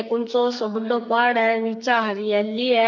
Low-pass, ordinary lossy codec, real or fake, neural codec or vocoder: 7.2 kHz; none; fake; codec, 44.1 kHz, 2.6 kbps, SNAC